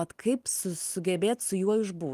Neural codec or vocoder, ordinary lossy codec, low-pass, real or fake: none; Opus, 24 kbps; 14.4 kHz; real